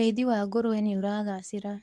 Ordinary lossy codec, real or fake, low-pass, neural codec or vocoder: none; fake; none; codec, 24 kHz, 0.9 kbps, WavTokenizer, medium speech release version 2